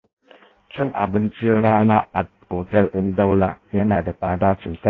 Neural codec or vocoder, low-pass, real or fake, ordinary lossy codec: codec, 16 kHz in and 24 kHz out, 0.6 kbps, FireRedTTS-2 codec; 7.2 kHz; fake; AAC, 32 kbps